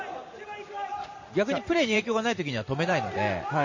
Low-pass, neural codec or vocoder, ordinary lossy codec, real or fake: 7.2 kHz; vocoder, 44.1 kHz, 128 mel bands every 512 samples, BigVGAN v2; MP3, 32 kbps; fake